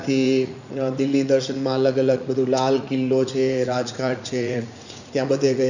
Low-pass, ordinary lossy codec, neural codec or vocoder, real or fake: 7.2 kHz; none; vocoder, 44.1 kHz, 80 mel bands, Vocos; fake